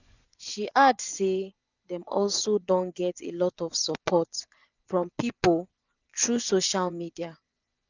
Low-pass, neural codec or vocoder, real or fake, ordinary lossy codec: 7.2 kHz; vocoder, 24 kHz, 100 mel bands, Vocos; fake; none